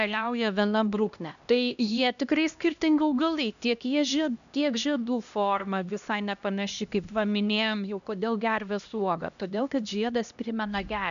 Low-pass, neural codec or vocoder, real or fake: 7.2 kHz; codec, 16 kHz, 1 kbps, X-Codec, HuBERT features, trained on LibriSpeech; fake